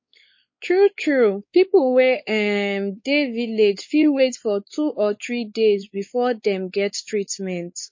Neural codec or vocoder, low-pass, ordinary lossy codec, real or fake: codec, 16 kHz, 4 kbps, X-Codec, WavLM features, trained on Multilingual LibriSpeech; 7.2 kHz; MP3, 32 kbps; fake